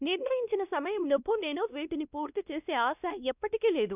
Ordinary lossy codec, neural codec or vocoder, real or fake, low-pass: none; codec, 16 kHz, 1 kbps, X-Codec, WavLM features, trained on Multilingual LibriSpeech; fake; 3.6 kHz